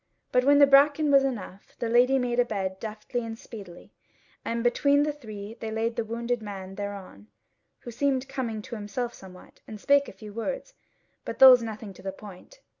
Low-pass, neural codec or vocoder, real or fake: 7.2 kHz; none; real